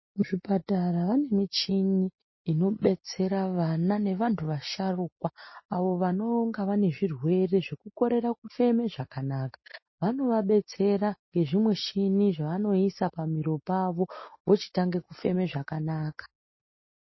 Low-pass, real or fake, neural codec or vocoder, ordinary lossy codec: 7.2 kHz; real; none; MP3, 24 kbps